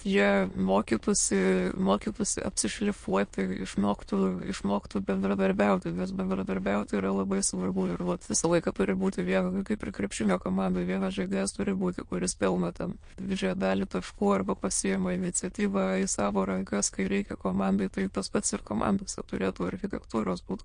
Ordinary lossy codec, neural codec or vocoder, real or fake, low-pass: MP3, 48 kbps; autoencoder, 22.05 kHz, a latent of 192 numbers a frame, VITS, trained on many speakers; fake; 9.9 kHz